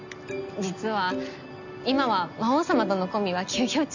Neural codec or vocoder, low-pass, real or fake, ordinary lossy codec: none; 7.2 kHz; real; none